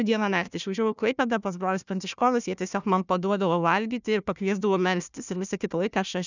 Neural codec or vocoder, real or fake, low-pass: codec, 16 kHz, 1 kbps, FunCodec, trained on Chinese and English, 50 frames a second; fake; 7.2 kHz